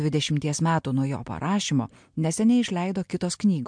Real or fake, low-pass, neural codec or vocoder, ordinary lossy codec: real; 9.9 kHz; none; MP3, 64 kbps